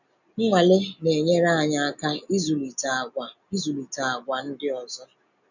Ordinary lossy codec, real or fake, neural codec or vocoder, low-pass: none; real; none; 7.2 kHz